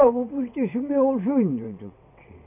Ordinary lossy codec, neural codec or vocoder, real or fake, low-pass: none; vocoder, 22.05 kHz, 80 mel bands, WaveNeXt; fake; 3.6 kHz